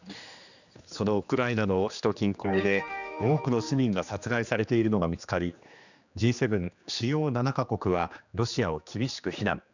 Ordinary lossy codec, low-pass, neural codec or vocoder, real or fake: none; 7.2 kHz; codec, 16 kHz, 2 kbps, X-Codec, HuBERT features, trained on general audio; fake